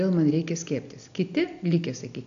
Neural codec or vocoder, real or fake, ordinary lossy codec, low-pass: none; real; AAC, 64 kbps; 7.2 kHz